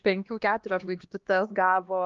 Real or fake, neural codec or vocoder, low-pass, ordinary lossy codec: fake; codec, 16 kHz, 1 kbps, X-Codec, HuBERT features, trained on LibriSpeech; 7.2 kHz; Opus, 24 kbps